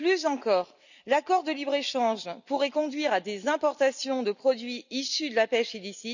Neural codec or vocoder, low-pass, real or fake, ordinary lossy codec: none; 7.2 kHz; real; none